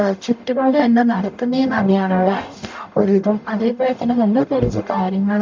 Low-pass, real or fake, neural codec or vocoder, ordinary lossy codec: 7.2 kHz; fake; codec, 44.1 kHz, 0.9 kbps, DAC; MP3, 64 kbps